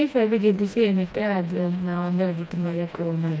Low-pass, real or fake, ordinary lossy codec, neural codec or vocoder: none; fake; none; codec, 16 kHz, 1 kbps, FreqCodec, smaller model